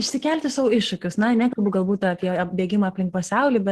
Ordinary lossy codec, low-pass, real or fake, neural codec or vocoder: Opus, 16 kbps; 14.4 kHz; real; none